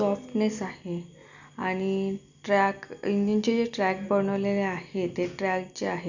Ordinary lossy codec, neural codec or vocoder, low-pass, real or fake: none; none; 7.2 kHz; real